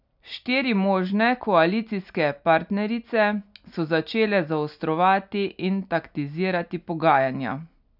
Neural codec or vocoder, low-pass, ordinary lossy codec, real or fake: none; 5.4 kHz; none; real